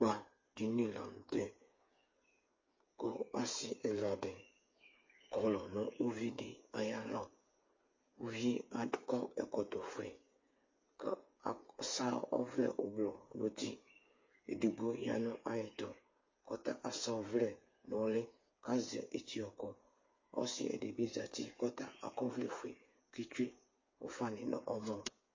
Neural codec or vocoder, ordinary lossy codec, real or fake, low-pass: codec, 16 kHz, 8 kbps, FreqCodec, smaller model; MP3, 32 kbps; fake; 7.2 kHz